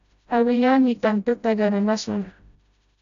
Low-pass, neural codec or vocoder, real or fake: 7.2 kHz; codec, 16 kHz, 0.5 kbps, FreqCodec, smaller model; fake